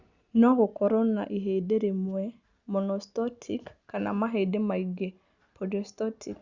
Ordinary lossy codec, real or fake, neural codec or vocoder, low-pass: Opus, 64 kbps; real; none; 7.2 kHz